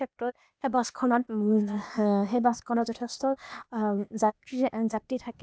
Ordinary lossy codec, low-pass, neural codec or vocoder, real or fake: none; none; codec, 16 kHz, 0.8 kbps, ZipCodec; fake